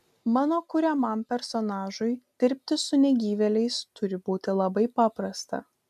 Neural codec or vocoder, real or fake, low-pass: none; real; 14.4 kHz